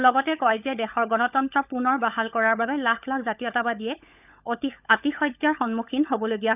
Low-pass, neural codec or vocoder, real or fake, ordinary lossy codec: 3.6 kHz; codec, 16 kHz, 16 kbps, FunCodec, trained on LibriTTS, 50 frames a second; fake; none